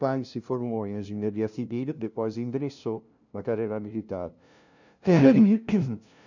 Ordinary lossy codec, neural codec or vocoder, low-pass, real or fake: none; codec, 16 kHz, 0.5 kbps, FunCodec, trained on LibriTTS, 25 frames a second; 7.2 kHz; fake